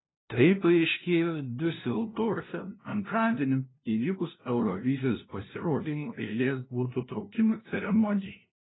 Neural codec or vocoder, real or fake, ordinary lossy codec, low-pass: codec, 16 kHz, 0.5 kbps, FunCodec, trained on LibriTTS, 25 frames a second; fake; AAC, 16 kbps; 7.2 kHz